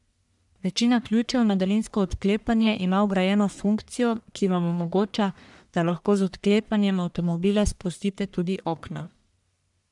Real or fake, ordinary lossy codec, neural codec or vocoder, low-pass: fake; none; codec, 44.1 kHz, 1.7 kbps, Pupu-Codec; 10.8 kHz